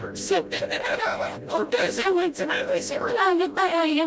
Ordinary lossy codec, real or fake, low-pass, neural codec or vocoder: none; fake; none; codec, 16 kHz, 0.5 kbps, FreqCodec, smaller model